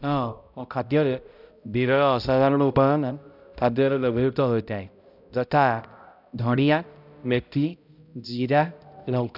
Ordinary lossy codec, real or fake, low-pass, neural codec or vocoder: none; fake; 5.4 kHz; codec, 16 kHz, 0.5 kbps, X-Codec, HuBERT features, trained on balanced general audio